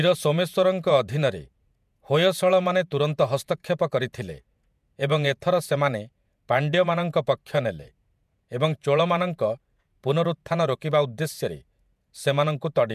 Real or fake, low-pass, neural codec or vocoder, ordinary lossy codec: fake; 14.4 kHz; vocoder, 48 kHz, 128 mel bands, Vocos; MP3, 96 kbps